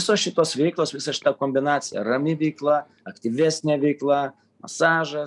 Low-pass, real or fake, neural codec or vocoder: 10.8 kHz; real; none